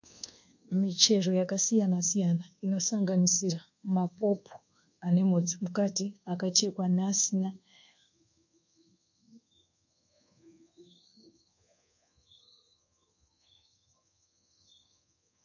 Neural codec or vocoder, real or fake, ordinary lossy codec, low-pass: codec, 24 kHz, 1.2 kbps, DualCodec; fake; AAC, 48 kbps; 7.2 kHz